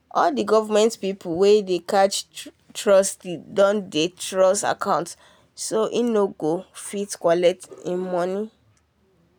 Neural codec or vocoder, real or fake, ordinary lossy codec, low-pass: none; real; none; none